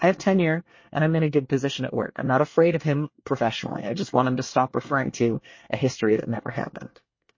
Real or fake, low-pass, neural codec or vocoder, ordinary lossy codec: fake; 7.2 kHz; codec, 24 kHz, 1 kbps, SNAC; MP3, 32 kbps